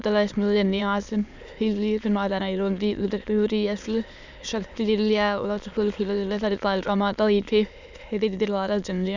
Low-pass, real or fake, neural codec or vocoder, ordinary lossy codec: 7.2 kHz; fake; autoencoder, 22.05 kHz, a latent of 192 numbers a frame, VITS, trained on many speakers; none